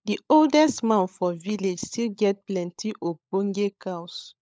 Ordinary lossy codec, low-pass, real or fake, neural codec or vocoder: none; none; fake; codec, 16 kHz, 16 kbps, FunCodec, trained on LibriTTS, 50 frames a second